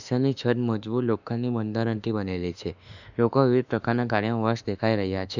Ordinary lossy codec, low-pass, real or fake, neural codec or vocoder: none; 7.2 kHz; fake; autoencoder, 48 kHz, 32 numbers a frame, DAC-VAE, trained on Japanese speech